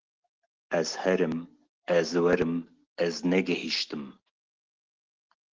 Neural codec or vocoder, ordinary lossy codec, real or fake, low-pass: none; Opus, 16 kbps; real; 7.2 kHz